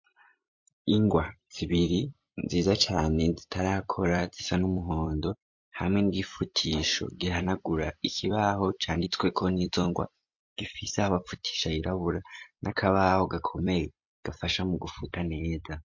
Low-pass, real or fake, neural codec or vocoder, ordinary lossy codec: 7.2 kHz; fake; vocoder, 44.1 kHz, 128 mel bands every 256 samples, BigVGAN v2; MP3, 48 kbps